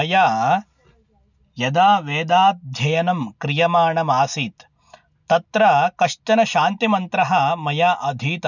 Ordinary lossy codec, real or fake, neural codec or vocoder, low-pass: none; real; none; 7.2 kHz